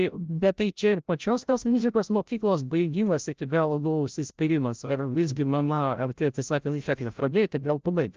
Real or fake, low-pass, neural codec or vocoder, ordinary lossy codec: fake; 7.2 kHz; codec, 16 kHz, 0.5 kbps, FreqCodec, larger model; Opus, 24 kbps